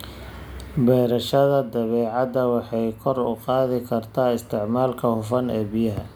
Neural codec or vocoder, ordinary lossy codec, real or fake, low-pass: none; none; real; none